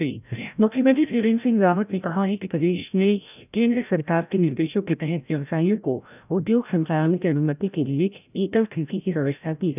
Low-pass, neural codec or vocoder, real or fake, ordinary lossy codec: 3.6 kHz; codec, 16 kHz, 0.5 kbps, FreqCodec, larger model; fake; none